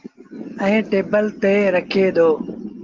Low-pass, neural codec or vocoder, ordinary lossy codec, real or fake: 7.2 kHz; none; Opus, 16 kbps; real